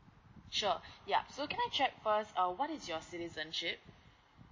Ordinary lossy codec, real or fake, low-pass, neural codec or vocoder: MP3, 32 kbps; real; 7.2 kHz; none